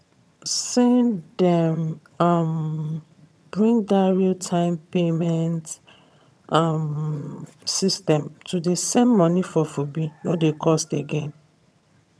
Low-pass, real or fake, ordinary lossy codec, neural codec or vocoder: none; fake; none; vocoder, 22.05 kHz, 80 mel bands, HiFi-GAN